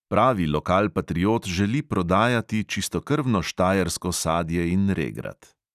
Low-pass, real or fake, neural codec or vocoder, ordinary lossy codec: 14.4 kHz; real; none; none